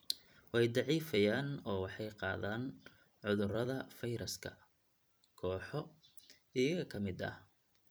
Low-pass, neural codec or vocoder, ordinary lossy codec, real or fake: none; vocoder, 44.1 kHz, 128 mel bands every 256 samples, BigVGAN v2; none; fake